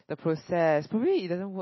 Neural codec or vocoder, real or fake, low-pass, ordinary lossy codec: none; real; 7.2 kHz; MP3, 24 kbps